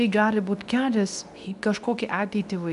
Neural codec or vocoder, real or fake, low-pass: codec, 24 kHz, 0.9 kbps, WavTokenizer, medium speech release version 2; fake; 10.8 kHz